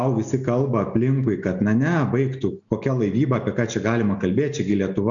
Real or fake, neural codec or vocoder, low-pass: real; none; 7.2 kHz